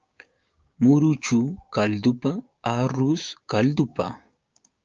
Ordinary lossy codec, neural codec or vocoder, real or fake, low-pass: Opus, 24 kbps; codec, 16 kHz, 6 kbps, DAC; fake; 7.2 kHz